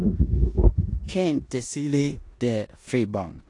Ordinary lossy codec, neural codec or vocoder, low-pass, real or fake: AAC, 48 kbps; codec, 16 kHz in and 24 kHz out, 0.9 kbps, LongCat-Audio-Codec, four codebook decoder; 10.8 kHz; fake